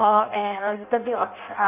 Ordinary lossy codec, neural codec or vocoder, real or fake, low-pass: none; codec, 16 kHz in and 24 kHz out, 0.6 kbps, FireRedTTS-2 codec; fake; 3.6 kHz